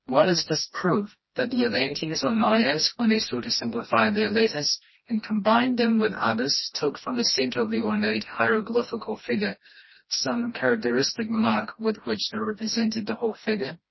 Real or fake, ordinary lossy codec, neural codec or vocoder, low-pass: fake; MP3, 24 kbps; codec, 16 kHz, 1 kbps, FreqCodec, smaller model; 7.2 kHz